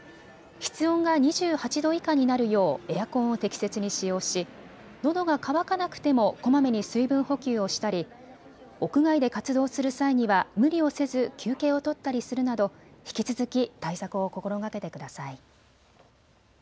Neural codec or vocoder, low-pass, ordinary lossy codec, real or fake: none; none; none; real